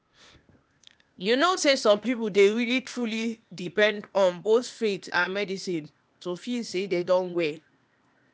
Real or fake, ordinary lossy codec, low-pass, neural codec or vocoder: fake; none; none; codec, 16 kHz, 0.8 kbps, ZipCodec